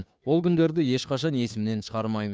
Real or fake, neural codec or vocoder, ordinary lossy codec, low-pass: fake; codec, 16 kHz, 2 kbps, FunCodec, trained on Chinese and English, 25 frames a second; none; none